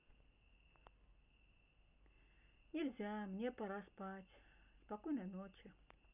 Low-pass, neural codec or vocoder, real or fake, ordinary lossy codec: 3.6 kHz; none; real; none